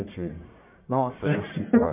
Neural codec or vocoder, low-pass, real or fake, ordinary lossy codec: codec, 44.1 kHz, 1.7 kbps, Pupu-Codec; 3.6 kHz; fake; MP3, 32 kbps